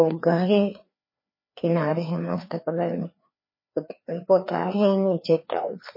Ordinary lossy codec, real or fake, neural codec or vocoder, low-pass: MP3, 24 kbps; fake; codec, 16 kHz, 2 kbps, FreqCodec, larger model; 5.4 kHz